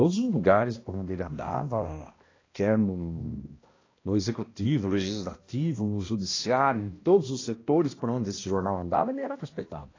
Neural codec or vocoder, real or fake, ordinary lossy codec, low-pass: codec, 16 kHz, 1 kbps, X-Codec, HuBERT features, trained on balanced general audio; fake; AAC, 32 kbps; 7.2 kHz